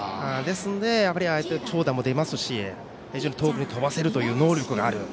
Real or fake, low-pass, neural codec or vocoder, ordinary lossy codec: real; none; none; none